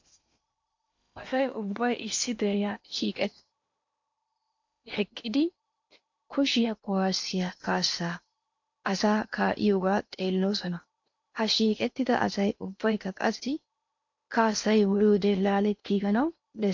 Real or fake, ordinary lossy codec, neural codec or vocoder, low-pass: fake; MP3, 48 kbps; codec, 16 kHz in and 24 kHz out, 0.8 kbps, FocalCodec, streaming, 65536 codes; 7.2 kHz